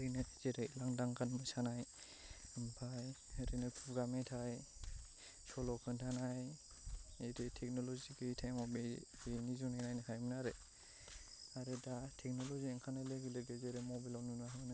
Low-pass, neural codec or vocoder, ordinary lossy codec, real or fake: none; none; none; real